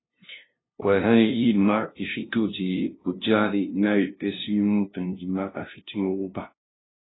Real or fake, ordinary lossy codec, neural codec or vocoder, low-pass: fake; AAC, 16 kbps; codec, 16 kHz, 0.5 kbps, FunCodec, trained on LibriTTS, 25 frames a second; 7.2 kHz